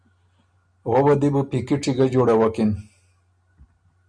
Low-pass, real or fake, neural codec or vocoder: 9.9 kHz; real; none